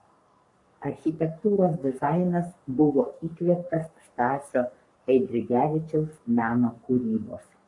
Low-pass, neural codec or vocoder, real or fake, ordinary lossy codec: 10.8 kHz; codec, 44.1 kHz, 3.4 kbps, Pupu-Codec; fake; MP3, 64 kbps